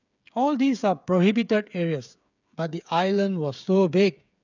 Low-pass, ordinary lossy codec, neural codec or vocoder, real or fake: 7.2 kHz; none; codec, 16 kHz, 8 kbps, FreqCodec, smaller model; fake